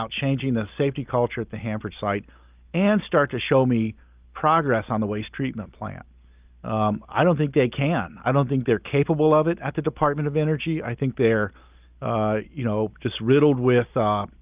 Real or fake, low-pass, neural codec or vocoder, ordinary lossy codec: real; 3.6 kHz; none; Opus, 24 kbps